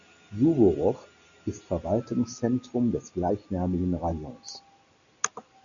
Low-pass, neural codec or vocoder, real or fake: 7.2 kHz; none; real